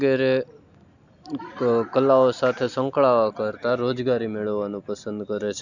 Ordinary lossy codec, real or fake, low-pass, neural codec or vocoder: none; real; 7.2 kHz; none